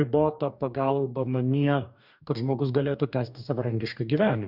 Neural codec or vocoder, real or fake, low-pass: codec, 44.1 kHz, 2.6 kbps, DAC; fake; 5.4 kHz